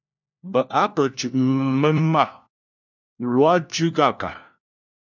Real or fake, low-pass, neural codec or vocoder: fake; 7.2 kHz; codec, 16 kHz, 1 kbps, FunCodec, trained on LibriTTS, 50 frames a second